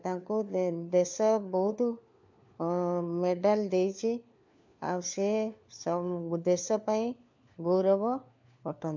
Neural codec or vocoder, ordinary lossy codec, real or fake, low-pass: codec, 16 kHz, 4 kbps, FunCodec, trained on LibriTTS, 50 frames a second; MP3, 64 kbps; fake; 7.2 kHz